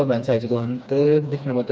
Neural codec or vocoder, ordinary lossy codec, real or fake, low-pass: codec, 16 kHz, 2 kbps, FreqCodec, smaller model; none; fake; none